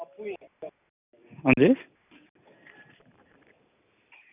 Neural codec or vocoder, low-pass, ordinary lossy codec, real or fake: none; 3.6 kHz; none; real